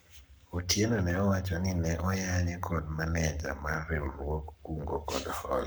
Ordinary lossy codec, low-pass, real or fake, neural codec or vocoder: none; none; fake; codec, 44.1 kHz, 7.8 kbps, Pupu-Codec